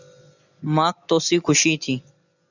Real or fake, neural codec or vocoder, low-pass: real; none; 7.2 kHz